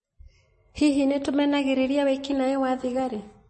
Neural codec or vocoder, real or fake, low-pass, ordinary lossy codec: none; real; 9.9 kHz; MP3, 32 kbps